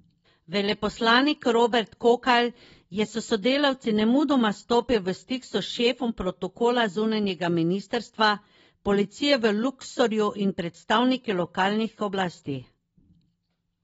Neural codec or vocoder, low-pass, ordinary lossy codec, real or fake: none; 19.8 kHz; AAC, 24 kbps; real